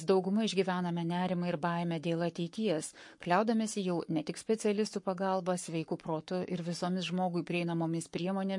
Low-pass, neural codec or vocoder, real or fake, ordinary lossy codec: 10.8 kHz; codec, 44.1 kHz, 7.8 kbps, Pupu-Codec; fake; MP3, 48 kbps